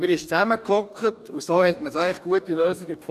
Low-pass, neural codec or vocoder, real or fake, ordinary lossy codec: 14.4 kHz; codec, 44.1 kHz, 2.6 kbps, DAC; fake; none